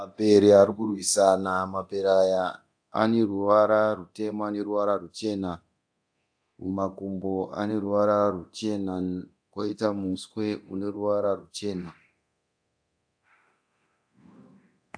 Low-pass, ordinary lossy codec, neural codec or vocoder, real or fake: 9.9 kHz; AAC, 64 kbps; codec, 24 kHz, 0.9 kbps, DualCodec; fake